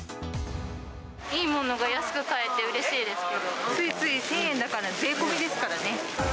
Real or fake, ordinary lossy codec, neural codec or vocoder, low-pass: real; none; none; none